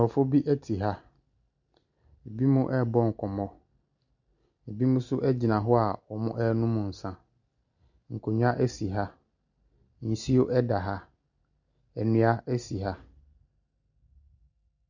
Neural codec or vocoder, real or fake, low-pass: none; real; 7.2 kHz